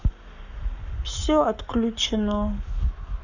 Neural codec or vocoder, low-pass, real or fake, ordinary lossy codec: codec, 44.1 kHz, 7.8 kbps, Pupu-Codec; 7.2 kHz; fake; none